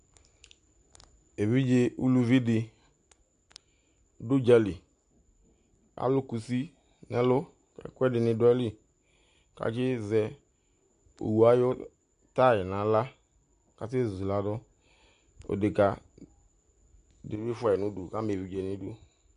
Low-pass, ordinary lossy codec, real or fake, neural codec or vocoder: 9.9 kHz; AAC, 96 kbps; real; none